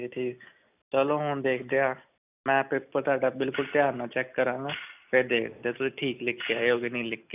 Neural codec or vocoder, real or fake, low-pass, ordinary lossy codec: none; real; 3.6 kHz; none